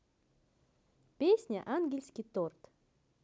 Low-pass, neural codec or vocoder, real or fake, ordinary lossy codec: none; none; real; none